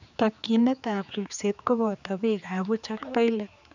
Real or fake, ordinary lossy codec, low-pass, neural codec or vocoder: fake; none; 7.2 kHz; codec, 16 kHz, 4 kbps, X-Codec, HuBERT features, trained on general audio